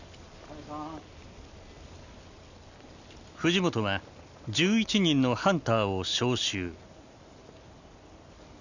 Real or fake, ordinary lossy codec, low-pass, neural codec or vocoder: real; none; 7.2 kHz; none